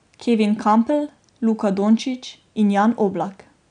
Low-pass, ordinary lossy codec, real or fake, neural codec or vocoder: 9.9 kHz; none; real; none